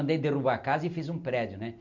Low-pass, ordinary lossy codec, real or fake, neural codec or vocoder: 7.2 kHz; none; real; none